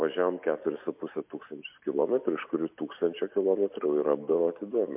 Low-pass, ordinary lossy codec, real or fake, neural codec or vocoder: 3.6 kHz; MP3, 32 kbps; real; none